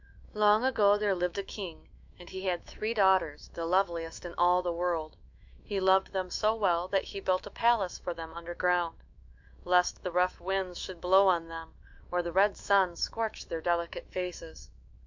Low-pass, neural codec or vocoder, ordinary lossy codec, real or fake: 7.2 kHz; autoencoder, 48 kHz, 128 numbers a frame, DAC-VAE, trained on Japanese speech; MP3, 64 kbps; fake